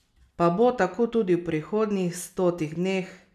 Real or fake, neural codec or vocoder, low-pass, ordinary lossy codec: real; none; 14.4 kHz; none